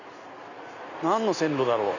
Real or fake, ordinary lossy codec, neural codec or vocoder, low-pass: real; none; none; 7.2 kHz